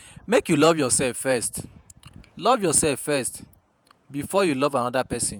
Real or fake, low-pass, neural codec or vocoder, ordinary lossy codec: fake; none; vocoder, 48 kHz, 128 mel bands, Vocos; none